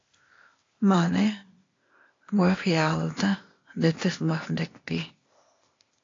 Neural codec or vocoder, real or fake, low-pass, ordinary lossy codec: codec, 16 kHz, 0.8 kbps, ZipCodec; fake; 7.2 kHz; AAC, 32 kbps